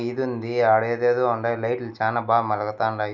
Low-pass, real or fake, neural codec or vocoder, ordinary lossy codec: 7.2 kHz; real; none; none